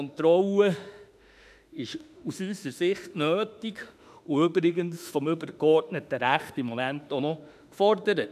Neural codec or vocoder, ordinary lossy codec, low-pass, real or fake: autoencoder, 48 kHz, 32 numbers a frame, DAC-VAE, trained on Japanese speech; none; 14.4 kHz; fake